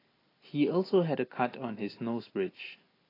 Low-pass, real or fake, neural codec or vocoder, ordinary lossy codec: 5.4 kHz; real; none; AAC, 24 kbps